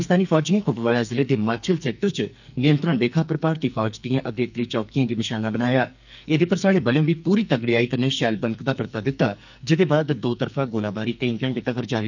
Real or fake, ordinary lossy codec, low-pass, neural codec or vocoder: fake; none; 7.2 kHz; codec, 44.1 kHz, 2.6 kbps, SNAC